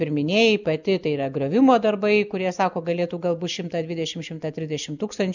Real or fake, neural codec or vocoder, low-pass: real; none; 7.2 kHz